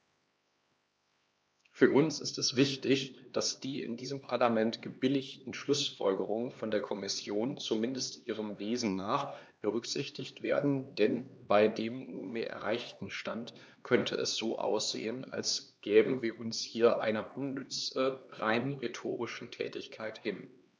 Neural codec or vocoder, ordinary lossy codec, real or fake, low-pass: codec, 16 kHz, 2 kbps, X-Codec, HuBERT features, trained on LibriSpeech; none; fake; none